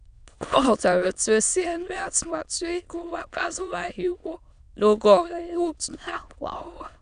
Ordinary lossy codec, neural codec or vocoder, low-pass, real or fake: none; autoencoder, 22.05 kHz, a latent of 192 numbers a frame, VITS, trained on many speakers; 9.9 kHz; fake